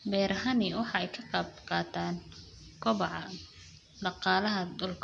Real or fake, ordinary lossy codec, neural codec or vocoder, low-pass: real; none; none; 10.8 kHz